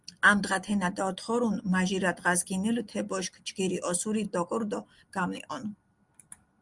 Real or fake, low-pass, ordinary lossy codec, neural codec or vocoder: real; 10.8 kHz; Opus, 32 kbps; none